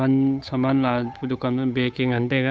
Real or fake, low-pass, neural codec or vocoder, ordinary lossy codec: fake; none; codec, 16 kHz, 8 kbps, FunCodec, trained on Chinese and English, 25 frames a second; none